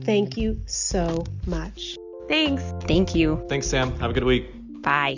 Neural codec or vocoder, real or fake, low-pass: none; real; 7.2 kHz